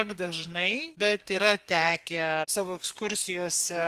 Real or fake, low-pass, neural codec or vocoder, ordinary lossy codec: fake; 14.4 kHz; codec, 32 kHz, 1.9 kbps, SNAC; Opus, 32 kbps